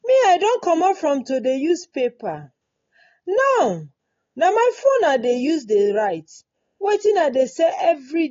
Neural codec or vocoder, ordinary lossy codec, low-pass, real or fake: none; AAC, 32 kbps; 7.2 kHz; real